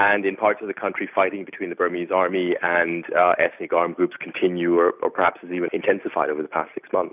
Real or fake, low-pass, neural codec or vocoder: real; 3.6 kHz; none